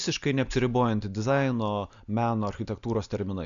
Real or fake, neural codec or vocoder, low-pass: real; none; 7.2 kHz